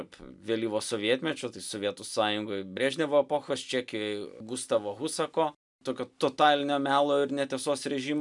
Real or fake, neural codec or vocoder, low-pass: real; none; 10.8 kHz